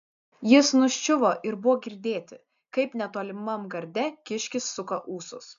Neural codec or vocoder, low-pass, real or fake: none; 7.2 kHz; real